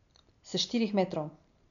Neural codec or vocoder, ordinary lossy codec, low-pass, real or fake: none; none; 7.2 kHz; real